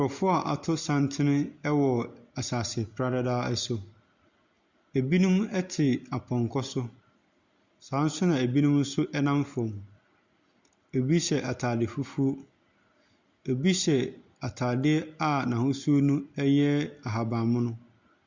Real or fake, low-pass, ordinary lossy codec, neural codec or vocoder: real; 7.2 kHz; Opus, 64 kbps; none